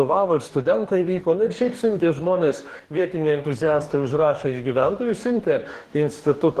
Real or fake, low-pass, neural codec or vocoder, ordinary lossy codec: fake; 14.4 kHz; codec, 44.1 kHz, 2.6 kbps, DAC; Opus, 16 kbps